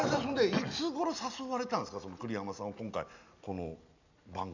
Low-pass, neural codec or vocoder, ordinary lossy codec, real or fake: 7.2 kHz; none; none; real